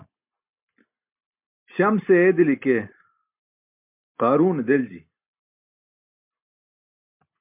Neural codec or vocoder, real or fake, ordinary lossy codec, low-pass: none; real; MP3, 32 kbps; 3.6 kHz